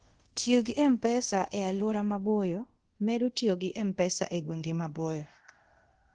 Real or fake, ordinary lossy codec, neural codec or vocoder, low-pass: fake; Opus, 16 kbps; codec, 24 kHz, 0.5 kbps, DualCodec; 9.9 kHz